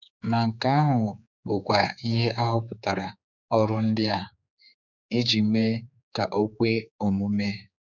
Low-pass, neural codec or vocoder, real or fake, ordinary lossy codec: 7.2 kHz; codec, 16 kHz, 4 kbps, X-Codec, HuBERT features, trained on general audio; fake; none